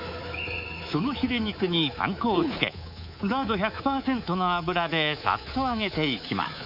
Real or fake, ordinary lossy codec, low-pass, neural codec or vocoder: fake; none; 5.4 kHz; codec, 24 kHz, 3.1 kbps, DualCodec